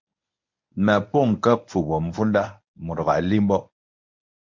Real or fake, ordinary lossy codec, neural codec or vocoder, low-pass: fake; MP3, 64 kbps; codec, 24 kHz, 0.9 kbps, WavTokenizer, medium speech release version 1; 7.2 kHz